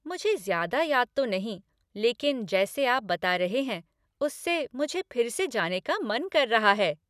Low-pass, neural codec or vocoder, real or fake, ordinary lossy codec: 14.4 kHz; none; real; none